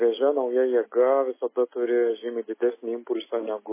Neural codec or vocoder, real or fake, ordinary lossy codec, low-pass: none; real; MP3, 16 kbps; 3.6 kHz